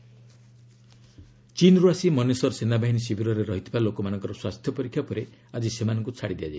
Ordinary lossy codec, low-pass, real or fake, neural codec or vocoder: none; none; real; none